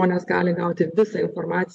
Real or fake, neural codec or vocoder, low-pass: real; none; 10.8 kHz